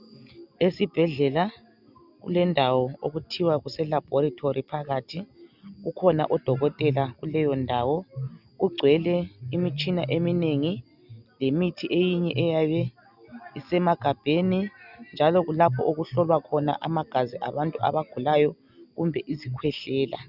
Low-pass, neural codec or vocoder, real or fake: 5.4 kHz; none; real